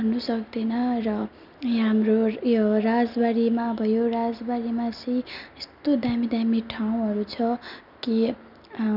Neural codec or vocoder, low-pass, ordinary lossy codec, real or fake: none; 5.4 kHz; none; real